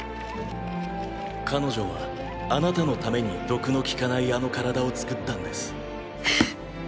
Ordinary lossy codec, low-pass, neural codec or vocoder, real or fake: none; none; none; real